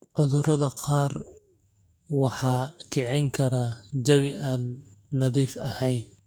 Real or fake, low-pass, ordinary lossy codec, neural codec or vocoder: fake; none; none; codec, 44.1 kHz, 2.6 kbps, DAC